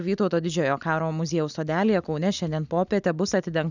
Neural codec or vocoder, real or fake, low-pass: none; real; 7.2 kHz